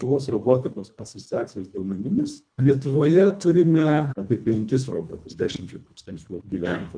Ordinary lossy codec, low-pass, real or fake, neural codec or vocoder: MP3, 96 kbps; 9.9 kHz; fake; codec, 24 kHz, 1.5 kbps, HILCodec